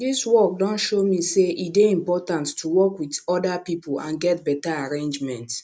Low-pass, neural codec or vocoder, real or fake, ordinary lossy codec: none; none; real; none